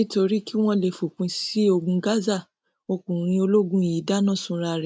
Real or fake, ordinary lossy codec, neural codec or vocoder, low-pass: real; none; none; none